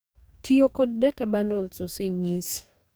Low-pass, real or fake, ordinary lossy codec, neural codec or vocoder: none; fake; none; codec, 44.1 kHz, 2.6 kbps, DAC